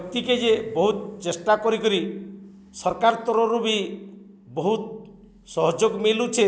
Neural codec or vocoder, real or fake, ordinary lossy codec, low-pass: none; real; none; none